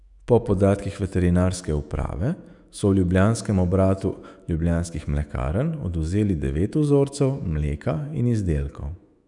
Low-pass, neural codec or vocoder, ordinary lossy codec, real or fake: 10.8 kHz; autoencoder, 48 kHz, 128 numbers a frame, DAC-VAE, trained on Japanese speech; none; fake